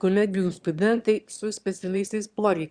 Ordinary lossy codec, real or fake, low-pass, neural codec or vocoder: Opus, 64 kbps; fake; 9.9 kHz; autoencoder, 22.05 kHz, a latent of 192 numbers a frame, VITS, trained on one speaker